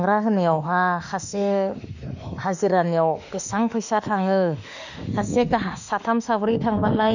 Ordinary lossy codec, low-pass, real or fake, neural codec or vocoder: none; 7.2 kHz; fake; autoencoder, 48 kHz, 32 numbers a frame, DAC-VAE, trained on Japanese speech